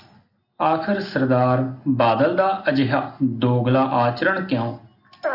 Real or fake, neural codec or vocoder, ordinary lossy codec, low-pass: real; none; AAC, 48 kbps; 5.4 kHz